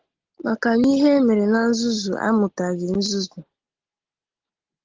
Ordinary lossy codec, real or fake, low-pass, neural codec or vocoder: Opus, 16 kbps; real; 7.2 kHz; none